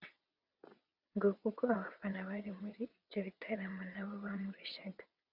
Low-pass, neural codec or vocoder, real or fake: 5.4 kHz; vocoder, 22.05 kHz, 80 mel bands, WaveNeXt; fake